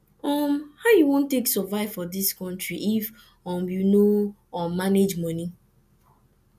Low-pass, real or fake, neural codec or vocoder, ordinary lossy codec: 14.4 kHz; real; none; none